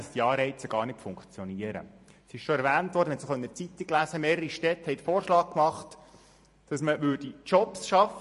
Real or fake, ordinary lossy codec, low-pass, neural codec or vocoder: fake; MP3, 48 kbps; 14.4 kHz; vocoder, 44.1 kHz, 128 mel bands every 512 samples, BigVGAN v2